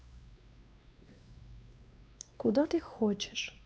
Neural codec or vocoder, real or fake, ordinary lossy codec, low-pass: codec, 16 kHz, 2 kbps, X-Codec, WavLM features, trained on Multilingual LibriSpeech; fake; none; none